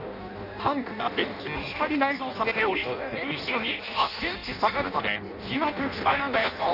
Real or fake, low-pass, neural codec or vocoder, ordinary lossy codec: fake; 5.4 kHz; codec, 16 kHz in and 24 kHz out, 0.6 kbps, FireRedTTS-2 codec; none